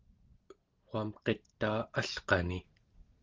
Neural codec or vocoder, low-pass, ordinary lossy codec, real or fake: none; 7.2 kHz; Opus, 16 kbps; real